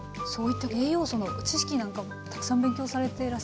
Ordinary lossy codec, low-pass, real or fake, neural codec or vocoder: none; none; real; none